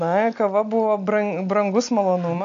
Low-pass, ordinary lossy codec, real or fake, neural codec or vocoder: 7.2 kHz; MP3, 96 kbps; real; none